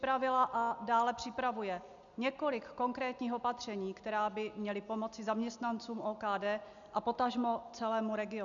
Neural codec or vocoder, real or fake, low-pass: none; real; 7.2 kHz